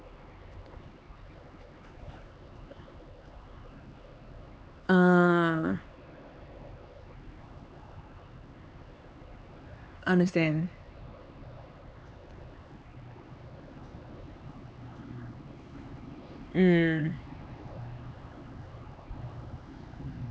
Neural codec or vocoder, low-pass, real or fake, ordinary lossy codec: codec, 16 kHz, 4 kbps, X-Codec, HuBERT features, trained on LibriSpeech; none; fake; none